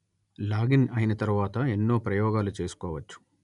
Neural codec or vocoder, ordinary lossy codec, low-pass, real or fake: none; none; 10.8 kHz; real